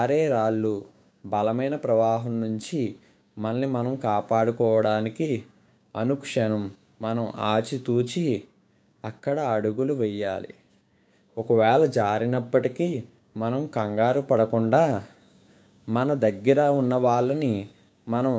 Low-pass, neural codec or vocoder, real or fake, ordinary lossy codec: none; codec, 16 kHz, 6 kbps, DAC; fake; none